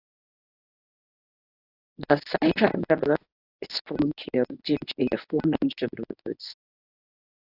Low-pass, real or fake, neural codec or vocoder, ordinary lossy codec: 5.4 kHz; fake; codec, 24 kHz, 0.9 kbps, WavTokenizer, medium speech release version 1; AAC, 48 kbps